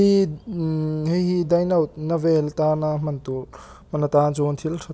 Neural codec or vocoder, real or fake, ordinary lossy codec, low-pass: none; real; none; none